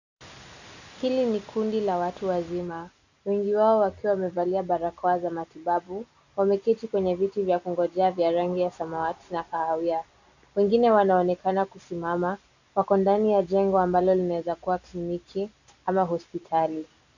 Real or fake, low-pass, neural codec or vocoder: real; 7.2 kHz; none